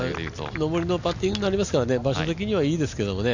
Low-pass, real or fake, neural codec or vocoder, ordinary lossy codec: 7.2 kHz; real; none; none